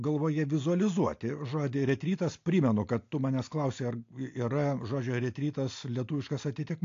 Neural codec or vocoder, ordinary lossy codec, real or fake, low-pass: none; AAC, 48 kbps; real; 7.2 kHz